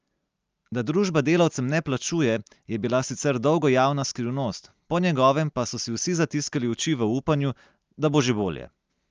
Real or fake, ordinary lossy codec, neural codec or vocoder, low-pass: real; Opus, 24 kbps; none; 7.2 kHz